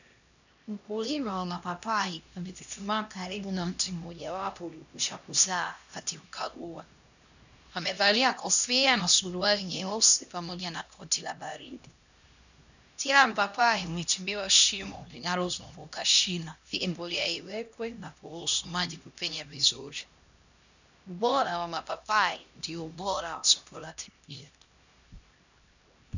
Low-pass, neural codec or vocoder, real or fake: 7.2 kHz; codec, 16 kHz, 1 kbps, X-Codec, HuBERT features, trained on LibriSpeech; fake